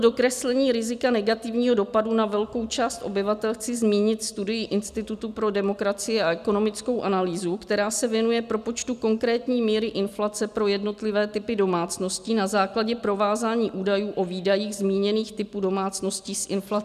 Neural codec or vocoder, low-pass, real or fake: none; 14.4 kHz; real